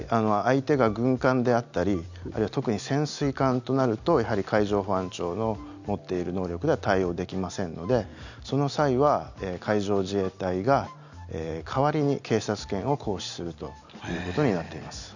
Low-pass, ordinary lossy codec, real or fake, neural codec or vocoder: 7.2 kHz; none; real; none